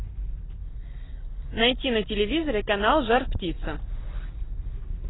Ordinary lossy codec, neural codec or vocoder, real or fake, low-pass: AAC, 16 kbps; none; real; 7.2 kHz